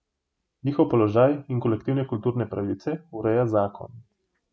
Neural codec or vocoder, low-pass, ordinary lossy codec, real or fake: none; none; none; real